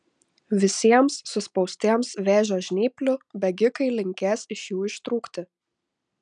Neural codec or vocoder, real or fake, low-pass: none; real; 9.9 kHz